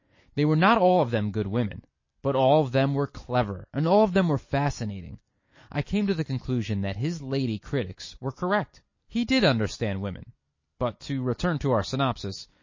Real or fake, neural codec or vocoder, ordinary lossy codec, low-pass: real; none; MP3, 32 kbps; 7.2 kHz